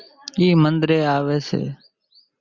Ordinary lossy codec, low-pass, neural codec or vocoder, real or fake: Opus, 64 kbps; 7.2 kHz; none; real